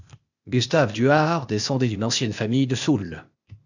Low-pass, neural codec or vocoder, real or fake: 7.2 kHz; codec, 16 kHz, 0.8 kbps, ZipCodec; fake